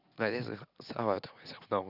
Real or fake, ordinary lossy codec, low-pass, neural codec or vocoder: real; none; 5.4 kHz; none